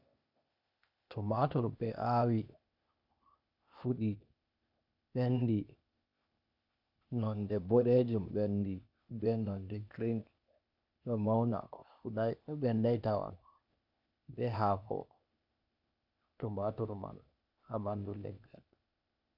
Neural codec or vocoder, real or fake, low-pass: codec, 16 kHz, 0.8 kbps, ZipCodec; fake; 5.4 kHz